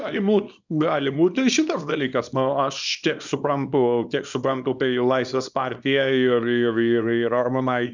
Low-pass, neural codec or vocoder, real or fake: 7.2 kHz; codec, 24 kHz, 0.9 kbps, WavTokenizer, small release; fake